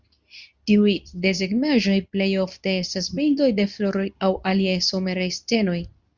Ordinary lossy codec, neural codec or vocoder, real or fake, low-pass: Opus, 64 kbps; codec, 24 kHz, 0.9 kbps, WavTokenizer, medium speech release version 2; fake; 7.2 kHz